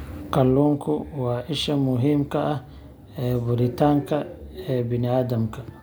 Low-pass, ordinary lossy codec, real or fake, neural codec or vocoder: none; none; real; none